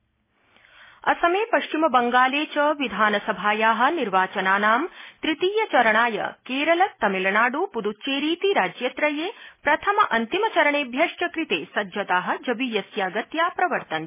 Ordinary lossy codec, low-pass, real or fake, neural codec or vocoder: MP3, 16 kbps; 3.6 kHz; real; none